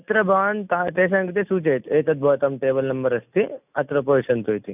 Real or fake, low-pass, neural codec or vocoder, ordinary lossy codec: real; 3.6 kHz; none; none